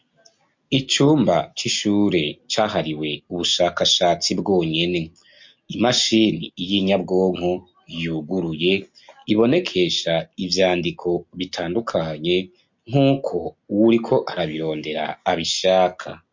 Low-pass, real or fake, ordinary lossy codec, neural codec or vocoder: 7.2 kHz; real; MP3, 48 kbps; none